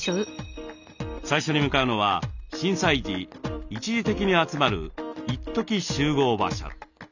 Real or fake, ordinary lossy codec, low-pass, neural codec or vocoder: real; none; 7.2 kHz; none